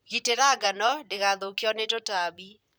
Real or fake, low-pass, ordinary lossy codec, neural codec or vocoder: fake; none; none; vocoder, 44.1 kHz, 128 mel bands, Pupu-Vocoder